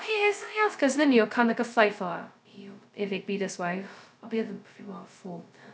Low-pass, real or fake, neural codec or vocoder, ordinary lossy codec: none; fake; codec, 16 kHz, 0.2 kbps, FocalCodec; none